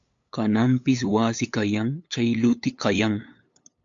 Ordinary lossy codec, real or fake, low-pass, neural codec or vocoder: AAC, 64 kbps; fake; 7.2 kHz; codec, 16 kHz, 8 kbps, FunCodec, trained on LibriTTS, 25 frames a second